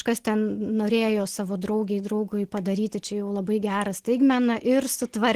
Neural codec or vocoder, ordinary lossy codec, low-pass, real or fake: none; Opus, 16 kbps; 14.4 kHz; real